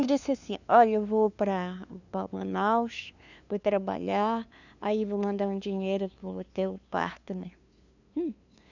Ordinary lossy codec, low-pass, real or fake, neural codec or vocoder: none; 7.2 kHz; fake; codec, 16 kHz, 2 kbps, FunCodec, trained on LibriTTS, 25 frames a second